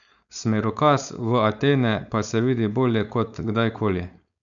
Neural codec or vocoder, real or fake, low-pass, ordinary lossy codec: codec, 16 kHz, 4.8 kbps, FACodec; fake; 7.2 kHz; none